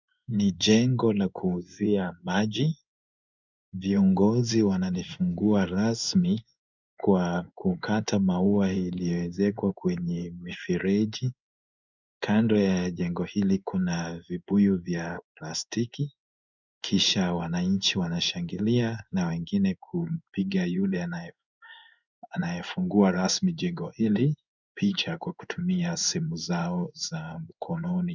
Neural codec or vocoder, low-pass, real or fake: codec, 16 kHz in and 24 kHz out, 1 kbps, XY-Tokenizer; 7.2 kHz; fake